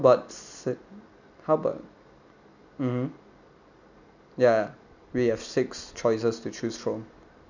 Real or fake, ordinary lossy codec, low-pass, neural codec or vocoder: real; none; 7.2 kHz; none